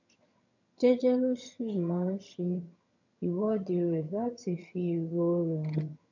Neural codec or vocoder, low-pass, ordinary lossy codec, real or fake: vocoder, 22.05 kHz, 80 mel bands, HiFi-GAN; 7.2 kHz; none; fake